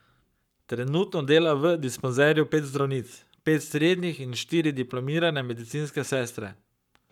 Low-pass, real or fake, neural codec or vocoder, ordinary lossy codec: 19.8 kHz; fake; codec, 44.1 kHz, 7.8 kbps, Pupu-Codec; none